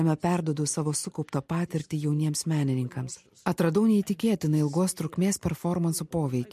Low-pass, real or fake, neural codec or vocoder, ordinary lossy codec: 14.4 kHz; real; none; MP3, 64 kbps